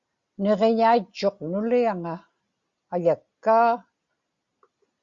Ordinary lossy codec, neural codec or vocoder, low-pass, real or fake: Opus, 64 kbps; none; 7.2 kHz; real